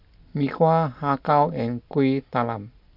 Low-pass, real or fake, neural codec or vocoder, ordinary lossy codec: 5.4 kHz; real; none; none